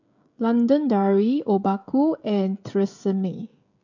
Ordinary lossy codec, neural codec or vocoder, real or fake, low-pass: none; codec, 16 kHz, 16 kbps, FreqCodec, smaller model; fake; 7.2 kHz